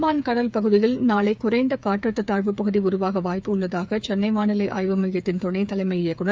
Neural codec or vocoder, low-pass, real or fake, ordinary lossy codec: codec, 16 kHz, 8 kbps, FreqCodec, smaller model; none; fake; none